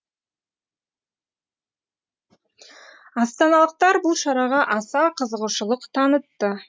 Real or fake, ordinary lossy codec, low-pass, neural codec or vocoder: real; none; none; none